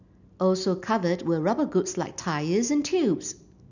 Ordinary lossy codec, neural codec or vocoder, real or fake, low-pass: none; none; real; 7.2 kHz